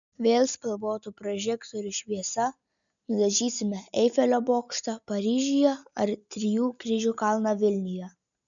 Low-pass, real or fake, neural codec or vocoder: 7.2 kHz; real; none